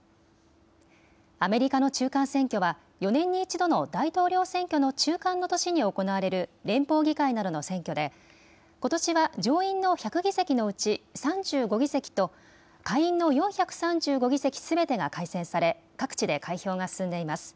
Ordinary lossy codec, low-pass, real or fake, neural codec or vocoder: none; none; real; none